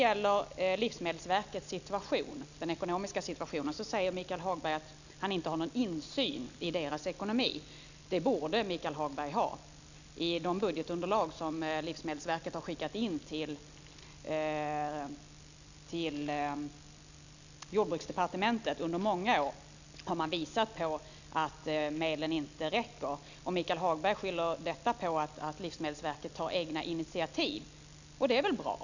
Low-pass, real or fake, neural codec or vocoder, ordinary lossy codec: 7.2 kHz; real; none; none